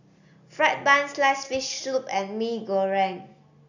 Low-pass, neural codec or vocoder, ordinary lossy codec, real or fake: 7.2 kHz; none; none; real